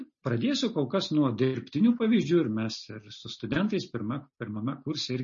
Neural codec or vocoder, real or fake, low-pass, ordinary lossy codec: none; real; 7.2 kHz; MP3, 32 kbps